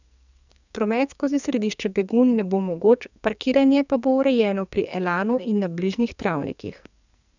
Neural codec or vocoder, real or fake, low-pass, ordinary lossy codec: codec, 44.1 kHz, 2.6 kbps, SNAC; fake; 7.2 kHz; none